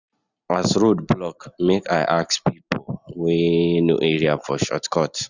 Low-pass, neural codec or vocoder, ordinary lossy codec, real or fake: 7.2 kHz; none; none; real